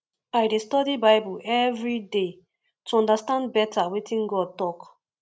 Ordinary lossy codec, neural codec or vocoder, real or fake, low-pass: none; none; real; none